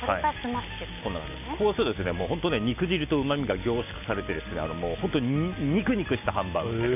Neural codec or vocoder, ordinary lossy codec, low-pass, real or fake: none; none; 3.6 kHz; real